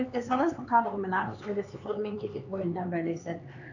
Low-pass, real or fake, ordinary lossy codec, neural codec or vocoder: 7.2 kHz; fake; none; codec, 16 kHz, 4 kbps, X-Codec, HuBERT features, trained on LibriSpeech